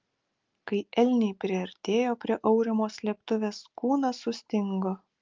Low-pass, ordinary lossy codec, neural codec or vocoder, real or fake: 7.2 kHz; Opus, 24 kbps; none; real